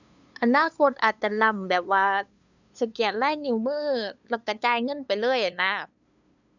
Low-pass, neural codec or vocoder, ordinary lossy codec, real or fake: 7.2 kHz; codec, 16 kHz, 2 kbps, FunCodec, trained on LibriTTS, 25 frames a second; none; fake